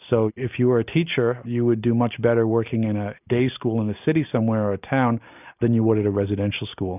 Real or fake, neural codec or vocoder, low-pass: real; none; 3.6 kHz